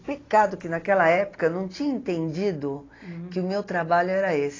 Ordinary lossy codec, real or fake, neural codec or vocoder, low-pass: AAC, 32 kbps; real; none; 7.2 kHz